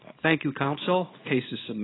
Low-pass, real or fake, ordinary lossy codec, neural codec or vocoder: 7.2 kHz; fake; AAC, 16 kbps; codec, 24 kHz, 0.9 kbps, WavTokenizer, small release